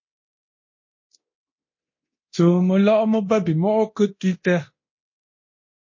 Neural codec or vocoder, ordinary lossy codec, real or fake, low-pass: codec, 24 kHz, 0.9 kbps, DualCodec; MP3, 32 kbps; fake; 7.2 kHz